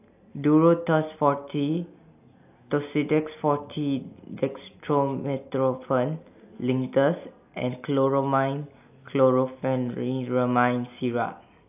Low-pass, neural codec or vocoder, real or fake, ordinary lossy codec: 3.6 kHz; none; real; AAC, 32 kbps